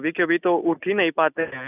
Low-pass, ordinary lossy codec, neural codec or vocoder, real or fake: 3.6 kHz; none; none; real